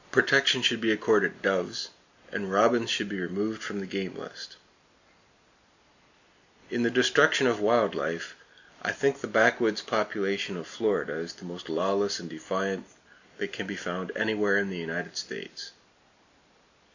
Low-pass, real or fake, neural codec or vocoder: 7.2 kHz; real; none